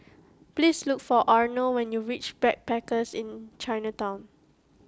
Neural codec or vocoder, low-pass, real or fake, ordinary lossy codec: none; none; real; none